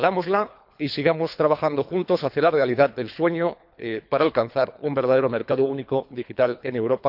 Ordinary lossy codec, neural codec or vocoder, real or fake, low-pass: none; codec, 24 kHz, 3 kbps, HILCodec; fake; 5.4 kHz